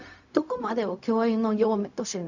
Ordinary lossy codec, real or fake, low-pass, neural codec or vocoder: none; fake; 7.2 kHz; codec, 16 kHz, 0.4 kbps, LongCat-Audio-Codec